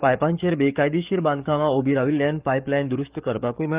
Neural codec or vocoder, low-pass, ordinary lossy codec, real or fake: codec, 44.1 kHz, 7.8 kbps, DAC; 3.6 kHz; Opus, 24 kbps; fake